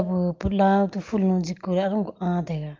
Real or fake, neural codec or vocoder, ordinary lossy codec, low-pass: real; none; Opus, 32 kbps; 7.2 kHz